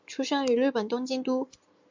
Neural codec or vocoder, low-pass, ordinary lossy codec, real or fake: none; 7.2 kHz; MP3, 64 kbps; real